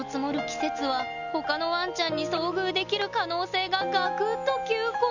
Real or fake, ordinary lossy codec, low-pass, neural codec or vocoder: real; none; 7.2 kHz; none